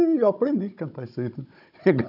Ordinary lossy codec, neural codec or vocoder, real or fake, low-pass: none; codec, 16 kHz, 16 kbps, FunCodec, trained on Chinese and English, 50 frames a second; fake; 5.4 kHz